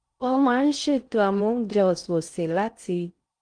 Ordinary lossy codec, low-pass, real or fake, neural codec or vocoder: Opus, 32 kbps; 9.9 kHz; fake; codec, 16 kHz in and 24 kHz out, 0.6 kbps, FocalCodec, streaming, 4096 codes